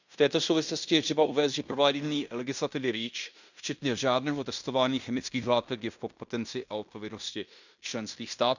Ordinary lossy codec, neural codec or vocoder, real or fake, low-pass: none; codec, 16 kHz in and 24 kHz out, 0.9 kbps, LongCat-Audio-Codec, fine tuned four codebook decoder; fake; 7.2 kHz